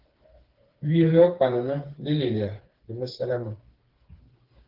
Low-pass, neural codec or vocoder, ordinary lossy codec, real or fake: 5.4 kHz; codec, 16 kHz, 4 kbps, FreqCodec, smaller model; Opus, 16 kbps; fake